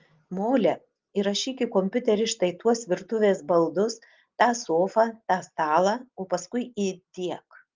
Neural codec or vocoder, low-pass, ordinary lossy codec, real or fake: none; 7.2 kHz; Opus, 32 kbps; real